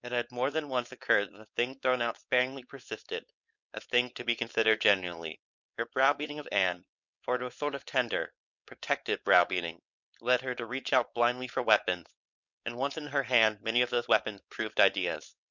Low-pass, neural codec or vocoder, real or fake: 7.2 kHz; codec, 16 kHz, 4.8 kbps, FACodec; fake